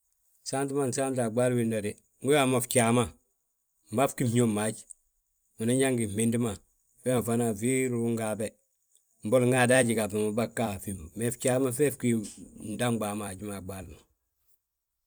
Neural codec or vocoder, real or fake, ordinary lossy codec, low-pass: vocoder, 44.1 kHz, 128 mel bands every 256 samples, BigVGAN v2; fake; none; none